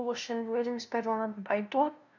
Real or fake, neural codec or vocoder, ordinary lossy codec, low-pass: fake; codec, 16 kHz, 0.5 kbps, FunCodec, trained on LibriTTS, 25 frames a second; none; 7.2 kHz